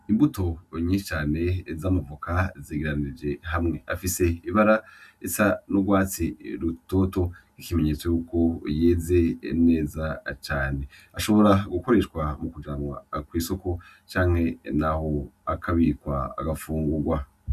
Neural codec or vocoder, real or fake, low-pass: none; real; 14.4 kHz